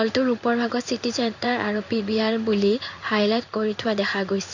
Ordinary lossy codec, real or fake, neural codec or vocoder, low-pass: none; fake; codec, 16 kHz in and 24 kHz out, 1 kbps, XY-Tokenizer; 7.2 kHz